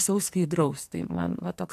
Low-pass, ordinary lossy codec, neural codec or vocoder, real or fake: 14.4 kHz; AAC, 64 kbps; codec, 44.1 kHz, 2.6 kbps, SNAC; fake